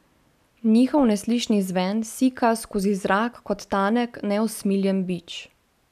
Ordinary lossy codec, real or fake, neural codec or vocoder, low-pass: none; real; none; 14.4 kHz